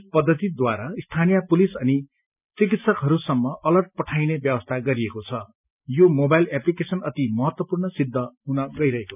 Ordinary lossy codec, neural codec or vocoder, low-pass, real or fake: none; none; 3.6 kHz; real